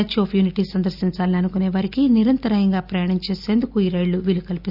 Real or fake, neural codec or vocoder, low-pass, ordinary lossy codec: real; none; 5.4 kHz; Opus, 64 kbps